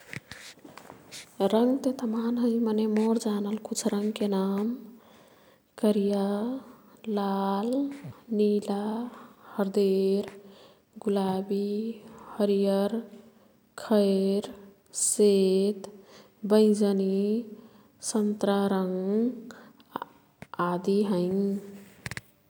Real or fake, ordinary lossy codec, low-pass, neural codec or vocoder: real; none; 19.8 kHz; none